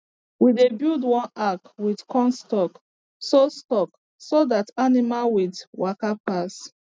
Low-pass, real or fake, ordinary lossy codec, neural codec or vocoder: none; real; none; none